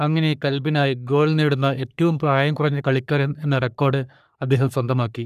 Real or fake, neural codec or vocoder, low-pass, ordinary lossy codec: fake; codec, 44.1 kHz, 3.4 kbps, Pupu-Codec; 14.4 kHz; none